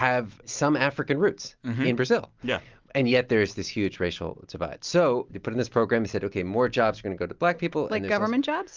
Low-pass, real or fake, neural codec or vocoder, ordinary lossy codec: 7.2 kHz; real; none; Opus, 24 kbps